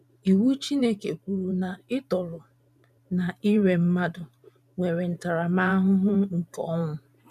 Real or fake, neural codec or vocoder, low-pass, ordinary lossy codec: fake; vocoder, 44.1 kHz, 128 mel bands every 512 samples, BigVGAN v2; 14.4 kHz; none